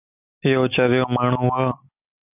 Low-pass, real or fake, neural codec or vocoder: 3.6 kHz; real; none